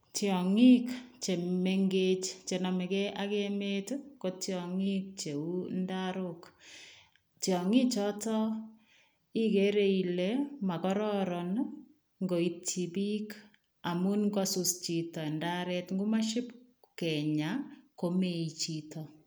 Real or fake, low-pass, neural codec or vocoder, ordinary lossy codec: real; none; none; none